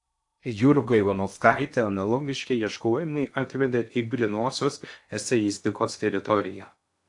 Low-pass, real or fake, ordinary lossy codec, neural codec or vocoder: 10.8 kHz; fake; AAC, 64 kbps; codec, 16 kHz in and 24 kHz out, 0.6 kbps, FocalCodec, streaming, 2048 codes